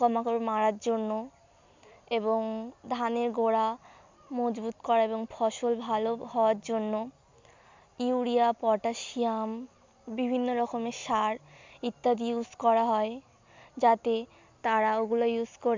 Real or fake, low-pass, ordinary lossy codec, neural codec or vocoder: real; 7.2 kHz; MP3, 64 kbps; none